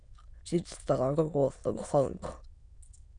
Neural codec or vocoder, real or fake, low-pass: autoencoder, 22.05 kHz, a latent of 192 numbers a frame, VITS, trained on many speakers; fake; 9.9 kHz